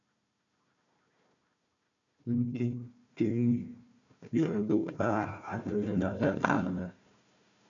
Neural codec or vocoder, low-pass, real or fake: codec, 16 kHz, 1 kbps, FunCodec, trained on Chinese and English, 50 frames a second; 7.2 kHz; fake